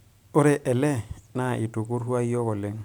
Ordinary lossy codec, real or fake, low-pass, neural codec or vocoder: none; fake; none; vocoder, 44.1 kHz, 128 mel bands every 512 samples, BigVGAN v2